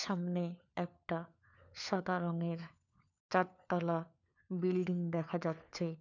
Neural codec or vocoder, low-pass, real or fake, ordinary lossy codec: codec, 16 kHz, 4 kbps, FunCodec, trained on LibriTTS, 50 frames a second; 7.2 kHz; fake; none